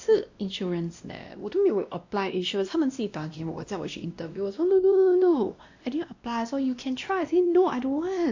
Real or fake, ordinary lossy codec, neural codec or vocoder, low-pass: fake; AAC, 48 kbps; codec, 16 kHz, 1 kbps, X-Codec, WavLM features, trained on Multilingual LibriSpeech; 7.2 kHz